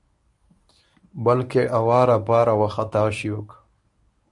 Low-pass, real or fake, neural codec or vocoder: 10.8 kHz; fake; codec, 24 kHz, 0.9 kbps, WavTokenizer, medium speech release version 1